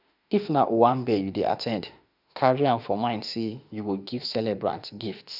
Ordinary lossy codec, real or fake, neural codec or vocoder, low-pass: none; fake; autoencoder, 48 kHz, 32 numbers a frame, DAC-VAE, trained on Japanese speech; 5.4 kHz